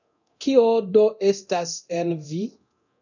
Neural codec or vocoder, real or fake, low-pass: codec, 24 kHz, 0.9 kbps, DualCodec; fake; 7.2 kHz